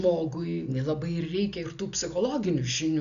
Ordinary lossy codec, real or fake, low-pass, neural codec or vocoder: MP3, 96 kbps; real; 7.2 kHz; none